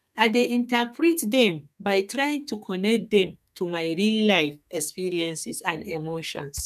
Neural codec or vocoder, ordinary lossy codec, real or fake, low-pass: codec, 32 kHz, 1.9 kbps, SNAC; none; fake; 14.4 kHz